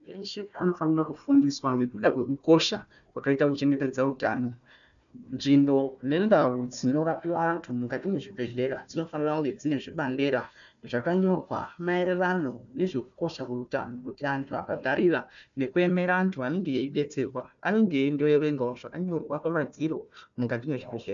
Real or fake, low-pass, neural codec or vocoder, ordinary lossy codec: fake; 7.2 kHz; codec, 16 kHz, 1 kbps, FunCodec, trained on Chinese and English, 50 frames a second; MP3, 96 kbps